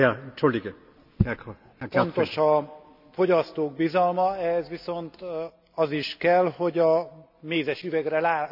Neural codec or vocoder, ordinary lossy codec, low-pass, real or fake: none; none; 5.4 kHz; real